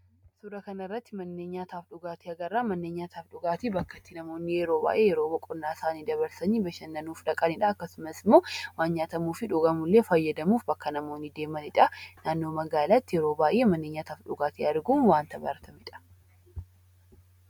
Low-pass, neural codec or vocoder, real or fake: 19.8 kHz; none; real